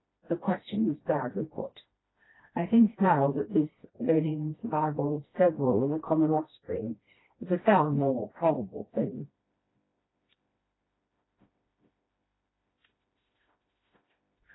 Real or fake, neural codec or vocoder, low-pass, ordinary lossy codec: fake; codec, 16 kHz, 1 kbps, FreqCodec, smaller model; 7.2 kHz; AAC, 16 kbps